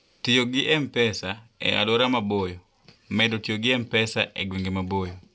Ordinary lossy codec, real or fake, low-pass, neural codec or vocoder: none; real; none; none